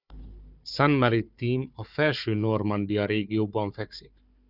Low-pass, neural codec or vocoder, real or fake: 5.4 kHz; codec, 16 kHz, 4 kbps, FunCodec, trained on Chinese and English, 50 frames a second; fake